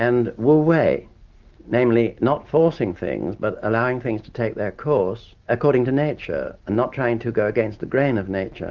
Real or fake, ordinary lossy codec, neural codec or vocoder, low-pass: real; Opus, 32 kbps; none; 7.2 kHz